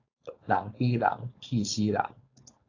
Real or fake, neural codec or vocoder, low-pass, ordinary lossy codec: fake; codec, 16 kHz, 4.8 kbps, FACodec; 7.2 kHz; AAC, 32 kbps